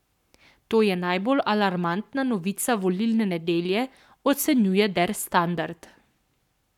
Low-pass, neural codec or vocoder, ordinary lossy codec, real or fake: 19.8 kHz; codec, 44.1 kHz, 7.8 kbps, Pupu-Codec; none; fake